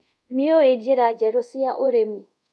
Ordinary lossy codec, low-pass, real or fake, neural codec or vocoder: none; none; fake; codec, 24 kHz, 0.5 kbps, DualCodec